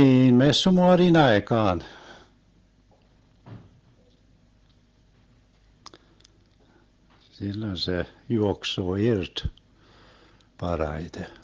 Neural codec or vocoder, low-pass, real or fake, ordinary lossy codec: none; 7.2 kHz; real; Opus, 16 kbps